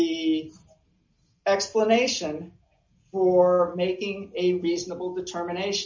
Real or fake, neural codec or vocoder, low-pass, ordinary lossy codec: real; none; 7.2 kHz; MP3, 64 kbps